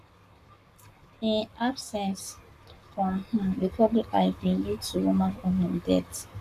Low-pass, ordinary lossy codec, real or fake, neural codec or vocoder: 14.4 kHz; none; fake; codec, 44.1 kHz, 7.8 kbps, Pupu-Codec